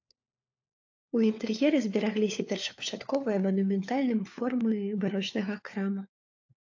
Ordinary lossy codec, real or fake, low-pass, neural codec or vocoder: AAC, 48 kbps; fake; 7.2 kHz; codec, 16 kHz, 4 kbps, FunCodec, trained on LibriTTS, 50 frames a second